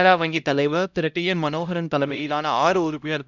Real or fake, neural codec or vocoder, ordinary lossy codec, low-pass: fake; codec, 16 kHz, 0.5 kbps, X-Codec, HuBERT features, trained on LibriSpeech; none; 7.2 kHz